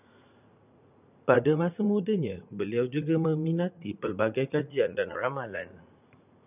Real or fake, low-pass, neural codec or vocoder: fake; 3.6 kHz; vocoder, 44.1 kHz, 80 mel bands, Vocos